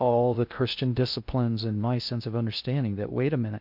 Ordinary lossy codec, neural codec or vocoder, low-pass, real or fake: MP3, 48 kbps; codec, 16 kHz in and 24 kHz out, 0.6 kbps, FocalCodec, streaming, 2048 codes; 5.4 kHz; fake